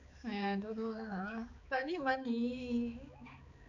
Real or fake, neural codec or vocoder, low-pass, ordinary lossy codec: fake; codec, 16 kHz, 4 kbps, X-Codec, HuBERT features, trained on balanced general audio; 7.2 kHz; none